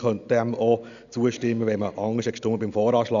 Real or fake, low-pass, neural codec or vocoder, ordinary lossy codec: real; 7.2 kHz; none; none